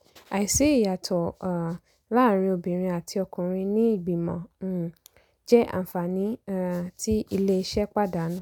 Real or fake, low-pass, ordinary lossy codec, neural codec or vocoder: real; 19.8 kHz; none; none